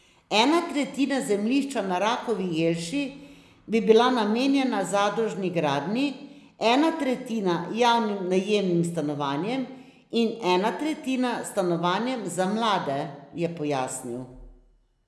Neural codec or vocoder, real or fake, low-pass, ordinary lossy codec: none; real; none; none